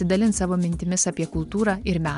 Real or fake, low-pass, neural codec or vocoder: real; 10.8 kHz; none